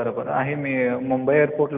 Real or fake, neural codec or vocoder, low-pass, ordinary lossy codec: real; none; 3.6 kHz; none